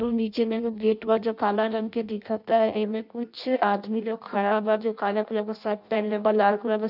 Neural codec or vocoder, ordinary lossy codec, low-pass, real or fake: codec, 16 kHz in and 24 kHz out, 0.6 kbps, FireRedTTS-2 codec; none; 5.4 kHz; fake